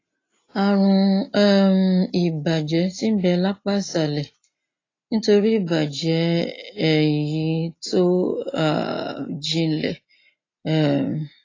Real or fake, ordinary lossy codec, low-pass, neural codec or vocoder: real; AAC, 32 kbps; 7.2 kHz; none